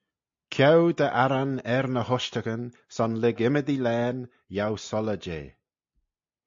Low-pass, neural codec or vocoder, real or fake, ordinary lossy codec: 7.2 kHz; none; real; MP3, 48 kbps